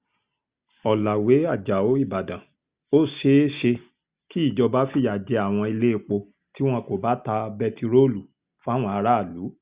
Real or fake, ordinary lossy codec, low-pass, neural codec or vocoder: real; Opus, 64 kbps; 3.6 kHz; none